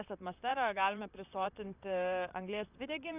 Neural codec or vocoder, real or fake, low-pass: vocoder, 44.1 kHz, 128 mel bands, Pupu-Vocoder; fake; 3.6 kHz